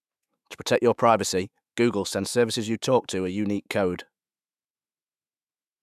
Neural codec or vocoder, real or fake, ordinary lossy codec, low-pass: autoencoder, 48 kHz, 128 numbers a frame, DAC-VAE, trained on Japanese speech; fake; none; 14.4 kHz